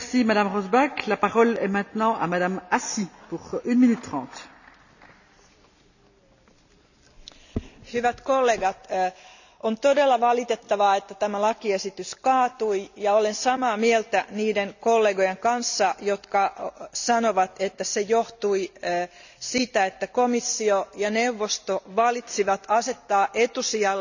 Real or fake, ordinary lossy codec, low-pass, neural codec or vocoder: real; none; 7.2 kHz; none